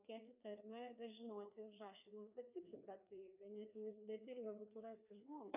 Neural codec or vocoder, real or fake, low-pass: codec, 16 kHz, 2 kbps, FreqCodec, larger model; fake; 3.6 kHz